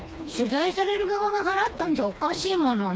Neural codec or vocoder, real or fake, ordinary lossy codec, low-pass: codec, 16 kHz, 2 kbps, FreqCodec, smaller model; fake; none; none